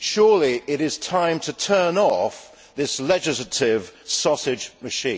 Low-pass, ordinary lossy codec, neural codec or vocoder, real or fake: none; none; none; real